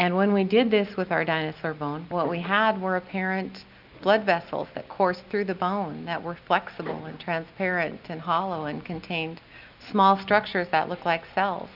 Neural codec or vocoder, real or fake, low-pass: none; real; 5.4 kHz